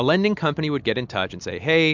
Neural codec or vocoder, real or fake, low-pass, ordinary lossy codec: none; real; 7.2 kHz; MP3, 64 kbps